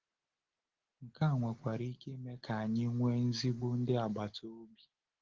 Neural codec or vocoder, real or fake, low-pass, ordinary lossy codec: none; real; 7.2 kHz; Opus, 16 kbps